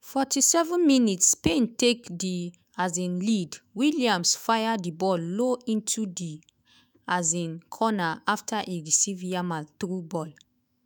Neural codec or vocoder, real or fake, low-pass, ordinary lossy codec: autoencoder, 48 kHz, 128 numbers a frame, DAC-VAE, trained on Japanese speech; fake; none; none